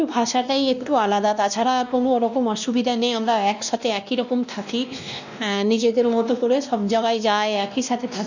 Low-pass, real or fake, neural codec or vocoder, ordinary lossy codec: 7.2 kHz; fake; codec, 16 kHz, 1 kbps, X-Codec, WavLM features, trained on Multilingual LibriSpeech; none